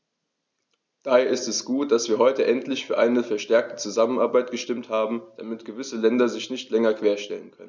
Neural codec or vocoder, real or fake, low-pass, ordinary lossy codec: none; real; none; none